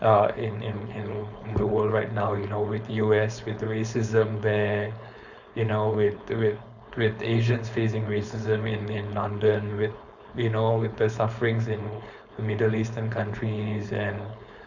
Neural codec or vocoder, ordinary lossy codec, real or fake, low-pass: codec, 16 kHz, 4.8 kbps, FACodec; none; fake; 7.2 kHz